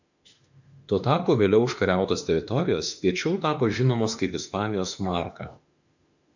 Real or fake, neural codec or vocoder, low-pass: fake; autoencoder, 48 kHz, 32 numbers a frame, DAC-VAE, trained on Japanese speech; 7.2 kHz